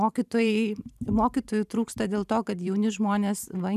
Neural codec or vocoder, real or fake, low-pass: vocoder, 44.1 kHz, 128 mel bands every 512 samples, BigVGAN v2; fake; 14.4 kHz